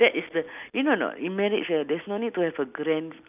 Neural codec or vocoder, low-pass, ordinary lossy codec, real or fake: none; 3.6 kHz; none; real